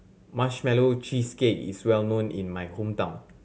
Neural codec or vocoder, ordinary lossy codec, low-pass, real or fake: none; none; none; real